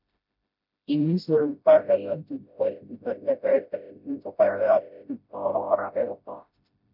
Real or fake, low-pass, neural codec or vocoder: fake; 5.4 kHz; codec, 16 kHz, 0.5 kbps, FreqCodec, smaller model